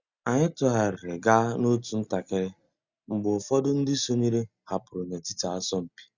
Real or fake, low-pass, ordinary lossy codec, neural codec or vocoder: real; 7.2 kHz; Opus, 64 kbps; none